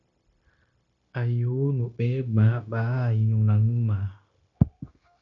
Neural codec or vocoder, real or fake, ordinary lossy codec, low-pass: codec, 16 kHz, 0.9 kbps, LongCat-Audio-Codec; fake; MP3, 96 kbps; 7.2 kHz